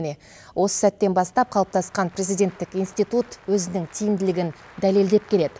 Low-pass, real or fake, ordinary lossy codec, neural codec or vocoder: none; real; none; none